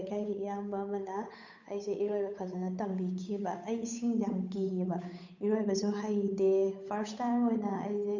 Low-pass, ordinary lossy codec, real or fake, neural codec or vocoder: 7.2 kHz; none; fake; codec, 16 kHz, 8 kbps, FunCodec, trained on Chinese and English, 25 frames a second